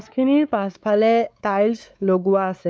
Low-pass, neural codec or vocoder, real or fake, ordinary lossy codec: none; codec, 16 kHz, 4 kbps, X-Codec, WavLM features, trained on Multilingual LibriSpeech; fake; none